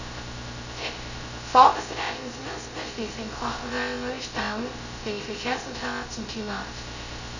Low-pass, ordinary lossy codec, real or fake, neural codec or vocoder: 7.2 kHz; none; fake; codec, 16 kHz, 0.2 kbps, FocalCodec